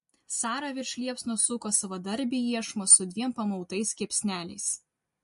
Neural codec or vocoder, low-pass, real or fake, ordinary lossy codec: none; 14.4 kHz; real; MP3, 48 kbps